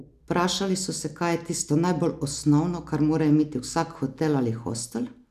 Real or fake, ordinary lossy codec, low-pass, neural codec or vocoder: real; none; 14.4 kHz; none